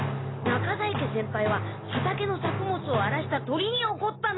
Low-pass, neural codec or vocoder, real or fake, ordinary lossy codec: 7.2 kHz; none; real; AAC, 16 kbps